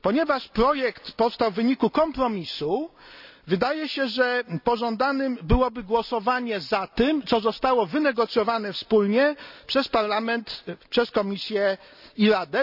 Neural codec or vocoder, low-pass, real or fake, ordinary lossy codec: none; 5.4 kHz; real; none